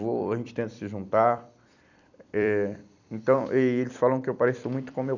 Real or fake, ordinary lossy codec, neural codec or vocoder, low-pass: fake; none; vocoder, 44.1 kHz, 128 mel bands every 256 samples, BigVGAN v2; 7.2 kHz